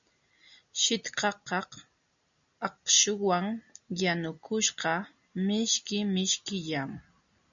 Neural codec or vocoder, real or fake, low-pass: none; real; 7.2 kHz